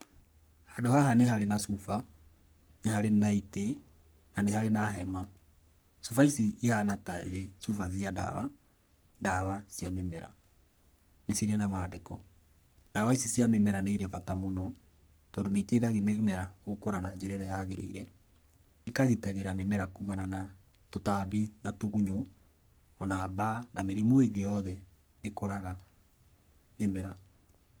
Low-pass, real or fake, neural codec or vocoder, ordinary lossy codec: none; fake; codec, 44.1 kHz, 3.4 kbps, Pupu-Codec; none